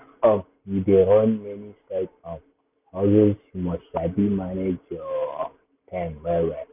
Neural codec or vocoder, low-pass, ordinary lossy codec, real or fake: none; 3.6 kHz; none; real